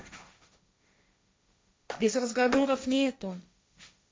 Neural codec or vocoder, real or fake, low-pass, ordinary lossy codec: codec, 16 kHz, 1.1 kbps, Voila-Tokenizer; fake; none; none